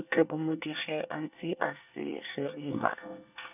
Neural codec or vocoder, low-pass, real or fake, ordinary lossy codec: codec, 24 kHz, 1 kbps, SNAC; 3.6 kHz; fake; none